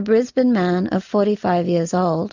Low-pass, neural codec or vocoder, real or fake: 7.2 kHz; none; real